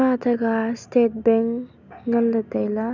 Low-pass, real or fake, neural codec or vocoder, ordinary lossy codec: 7.2 kHz; real; none; none